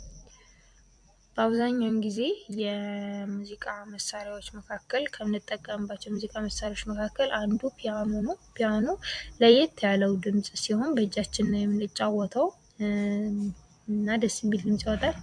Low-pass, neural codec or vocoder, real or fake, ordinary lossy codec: 9.9 kHz; vocoder, 44.1 kHz, 128 mel bands every 256 samples, BigVGAN v2; fake; MP3, 64 kbps